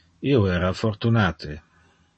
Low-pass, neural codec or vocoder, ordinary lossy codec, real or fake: 10.8 kHz; none; MP3, 32 kbps; real